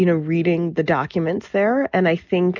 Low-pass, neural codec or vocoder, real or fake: 7.2 kHz; none; real